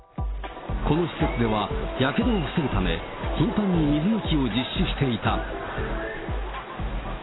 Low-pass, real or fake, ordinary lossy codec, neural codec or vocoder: 7.2 kHz; real; AAC, 16 kbps; none